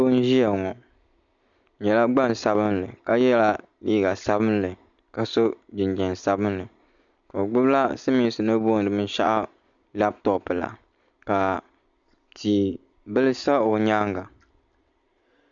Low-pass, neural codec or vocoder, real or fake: 7.2 kHz; none; real